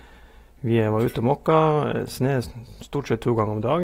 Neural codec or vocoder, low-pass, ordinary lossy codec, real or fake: none; 19.8 kHz; AAC, 48 kbps; real